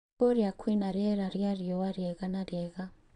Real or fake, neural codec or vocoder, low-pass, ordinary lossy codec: fake; vocoder, 22.05 kHz, 80 mel bands, Vocos; 9.9 kHz; AAC, 96 kbps